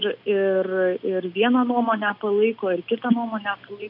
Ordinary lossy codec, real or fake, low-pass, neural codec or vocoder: MP3, 48 kbps; real; 5.4 kHz; none